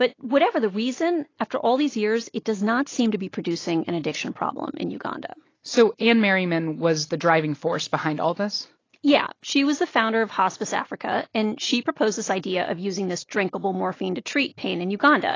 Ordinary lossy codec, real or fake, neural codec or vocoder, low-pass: AAC, 32 kbps; real; none; 7.2 kHz